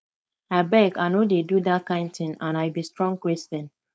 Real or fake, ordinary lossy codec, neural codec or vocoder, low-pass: fake; none; codec, 16 kHz, 4.8 kbps, FACodec; none